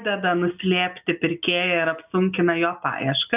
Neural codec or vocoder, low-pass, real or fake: none; 3.6 kHz; real